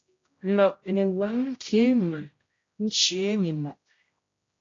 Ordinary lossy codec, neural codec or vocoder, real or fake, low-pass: AAC, 32 kbps; codec, 16 kHz, 0.5 kbps, X-Codec, HuBERT features, trained on general audio; fake; 7.2 kHz